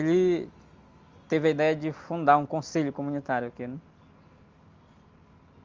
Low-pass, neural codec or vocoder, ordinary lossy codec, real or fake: 7.2 kHz; none; Opus, 32 kbps; real